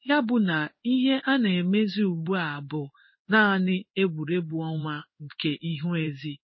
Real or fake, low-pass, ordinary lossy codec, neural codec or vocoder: fake; 7.2 kHz; MP3, 24 kbps; codec, 16 kHz in and 24 kHz out, 1 kbps, XY-Tokenizer